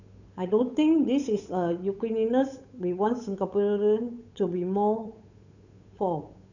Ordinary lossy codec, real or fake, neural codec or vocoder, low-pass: none; fake; codec, 16 kHz, 8 kbps, FunCodec, trained on Chinese and English, 25 frames a second; 7.2 kHz